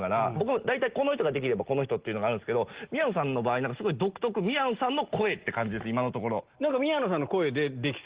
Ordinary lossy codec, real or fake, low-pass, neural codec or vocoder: Opus, 16 kbps; real; 3.6 kHz; none